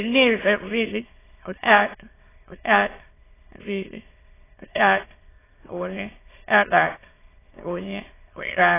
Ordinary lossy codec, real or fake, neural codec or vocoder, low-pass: AAC, 16 kbps; fake; autoencoder, 22.05 kHz, a latent of 192 numbers a frame, VITS, trained on many speakers; 3.6 kHz